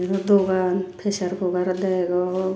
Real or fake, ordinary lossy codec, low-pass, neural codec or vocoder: real; none; none; none